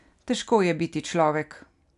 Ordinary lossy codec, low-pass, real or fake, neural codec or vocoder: none; 10.8 kHz; real; none